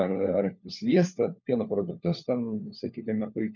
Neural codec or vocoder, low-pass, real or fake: codec, 16 kHz, 4 kbps, FunCodec, trained on LibriTTS, 50 frames a second; 7.2 kHz; fake